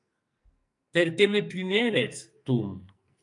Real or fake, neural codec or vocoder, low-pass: fake; codec, 44.1 kHz, 2.6 kbps, SNAC; 10.8 kHz